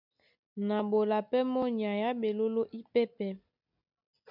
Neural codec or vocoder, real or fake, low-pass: none; real; 5.4 kHz